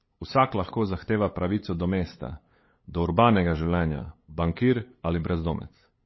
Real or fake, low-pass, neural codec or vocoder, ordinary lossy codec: fake; 7.2 kHz; codec, 16 kHz, 8 kbps, FunCodec, trained on LibriTTS, 25 frames a second; MP3, 24 kbps